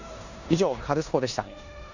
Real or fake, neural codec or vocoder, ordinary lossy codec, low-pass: fake; codec, 16 kHz in and 24 kHz out, 0.9 kbps, LongCat-Audio-Codec, four codebook decoder; none; 7.2 kHz